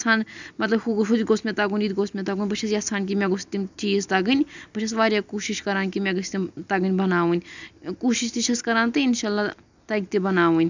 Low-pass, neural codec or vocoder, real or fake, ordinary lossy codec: 7.2 kHz; none; real; none